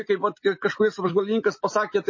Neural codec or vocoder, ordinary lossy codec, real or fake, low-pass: none; MP3, 32 kbps; real; 7.2 kHz